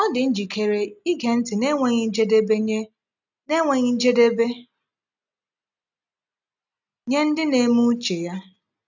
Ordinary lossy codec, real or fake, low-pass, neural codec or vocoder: none; real; 7.2 kHz; none